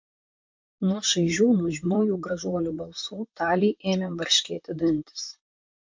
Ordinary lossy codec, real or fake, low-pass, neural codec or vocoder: MP3, 48 kbps; fake; 7.2 kHz; vocoder, 44.1 kHz, 128 mel bands, Pupu-Vocoder